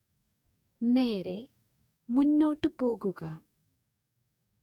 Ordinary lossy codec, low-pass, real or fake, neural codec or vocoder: none; 19.8 kHz; fake; codec, 44.1 kHz, 2.6 kbps, DAC